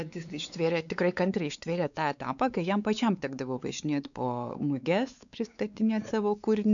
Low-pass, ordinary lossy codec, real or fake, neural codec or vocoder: 7.2 kHz; MP3, 96 kbps; fake; codec, 16 kHz, 4 kbps, X-Codec, WavLM features, trained on Multilingual LibriSpeech